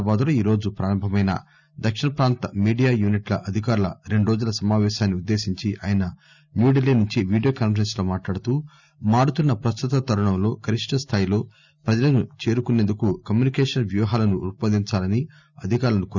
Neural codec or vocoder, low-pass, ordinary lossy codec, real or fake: none; 7.2 kHz; none; real